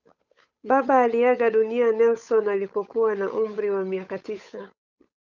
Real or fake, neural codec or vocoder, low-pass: fake; codec, 16 kHz, 8 kbps, FunCodec, trained on Chinese and English, 25 frames a second; 7.2 kHz